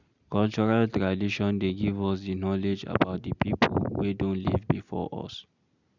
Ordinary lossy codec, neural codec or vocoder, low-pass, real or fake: none; none; 7.2 kHz; real